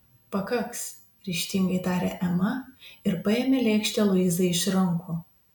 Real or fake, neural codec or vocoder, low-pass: real; none; 19.8 kHz